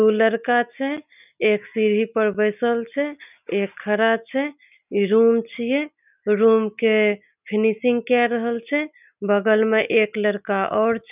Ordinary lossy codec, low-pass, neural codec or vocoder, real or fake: none; 3.6 kHz; none; real